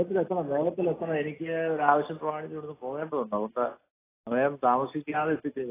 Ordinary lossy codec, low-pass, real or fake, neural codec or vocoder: AAC, 16 kbps; 3.6 kHz; real; none